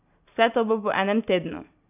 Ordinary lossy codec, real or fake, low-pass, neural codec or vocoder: none; real; 3.6 kHz; none